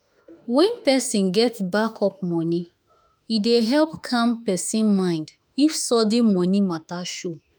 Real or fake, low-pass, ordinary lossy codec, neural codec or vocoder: fake; none; none; autoencoder, 48 kHz, 32 numbers a frame, DAC-VAE, trained on Japanese speech